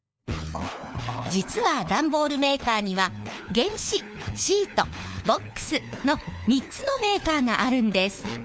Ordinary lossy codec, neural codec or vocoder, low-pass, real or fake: none; codec, 16 kHz, 4 kbps, FunCodec, trained on LibriTTS, 50 frames a second; none; fake